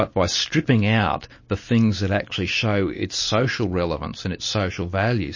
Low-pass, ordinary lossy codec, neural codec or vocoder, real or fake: 7.2 kHz; MP3, 32 kbps; none; real